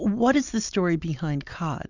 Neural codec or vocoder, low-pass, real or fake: none; 7.2 kHz; real